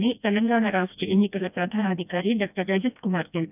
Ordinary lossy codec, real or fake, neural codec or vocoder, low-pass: none; fake; codec, 16 kHz, 1 kbps, FreqCodec, smaller model; 3.6 kHz